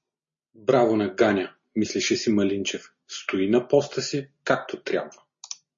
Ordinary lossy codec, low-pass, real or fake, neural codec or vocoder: MP3, 32 kbps; 7.2 kHz; real; none